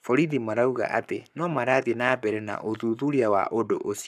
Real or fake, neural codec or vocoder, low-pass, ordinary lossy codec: fake; codec, 44.1 kHz, 7.8 kbps, Pupu-Codec; 14.4 kHz; none